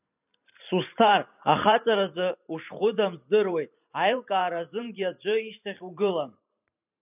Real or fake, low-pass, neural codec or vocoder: fake; 3.6 kHz; vocoder, 44.1 kHz, 128 mel bands every 512 samples, BigVGAN v2